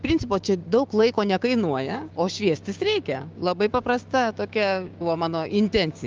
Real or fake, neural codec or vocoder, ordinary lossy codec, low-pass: fake; codec, 16 kHz, 6 kbps, DAC; Opus, 32 kbps; 7.2 kHz